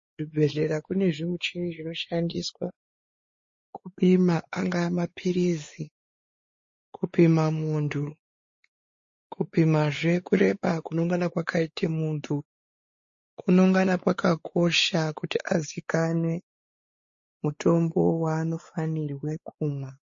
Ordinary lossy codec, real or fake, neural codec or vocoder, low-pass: MP3, 32 kbps; fake; codec, 16 kHz, 4 kbps, X-Codec, WavLM features, trained on Multilingual LibriSpeech; 7.2 kHz